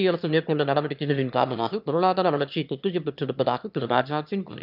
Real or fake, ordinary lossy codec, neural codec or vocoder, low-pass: fake; none; autoencoder, 22.05 kHz, a latent of 192 numbers a frame, VITS, trained on one speaker; 5.4 kHz